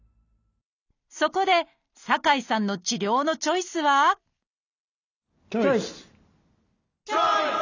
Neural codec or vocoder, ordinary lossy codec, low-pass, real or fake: none; none; 7.2 kHz; real